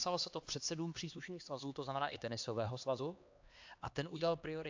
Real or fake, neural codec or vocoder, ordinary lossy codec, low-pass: fake; codec, 16 kHz, 2 kbps, X-Codec, HuBERT features, trained on LibriSpeech; AAC, 48 kbps; 7.2 kHz